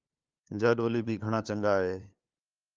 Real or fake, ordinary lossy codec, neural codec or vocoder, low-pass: fake; Opus, 24 kbps; codec, 16 kHz, 2 kbps, FunCodec, trained on LibriTTS, 25 frames a second; 7.2 kHz